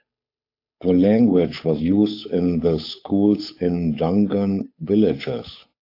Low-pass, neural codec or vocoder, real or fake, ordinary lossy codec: 5.4 kHz; codec, 16 kHz, 8 kbps, FunCodec, trained on Chinese and English, 25 frames a second; fake; AAC, 32 kbps